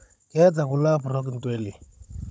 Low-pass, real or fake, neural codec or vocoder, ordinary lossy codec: none; fake; codec, 16 kHz, 16 kbps, FunCodec, trained on Chinese and English, 50 frames a second; none